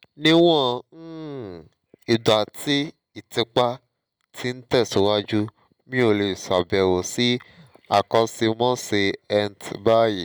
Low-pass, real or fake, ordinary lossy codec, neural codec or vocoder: none; real; none; none